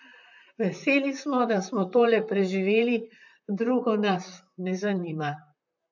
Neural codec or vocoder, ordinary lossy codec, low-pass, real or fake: vocoder, 44.1 kHz, 128 mel bands, Pupu-Vocoder; none; 7.2 kHz; fake